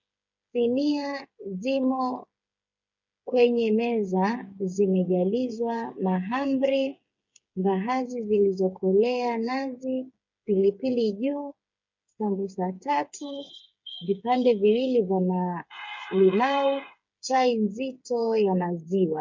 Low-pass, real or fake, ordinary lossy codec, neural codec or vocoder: 7.2 kHz; fake; MP3, 48 kbps; codec, 16 kHz, 8 kbps, FreqCodec, smaller model